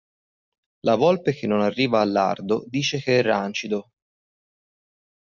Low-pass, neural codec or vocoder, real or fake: 7.2 kHz; none; real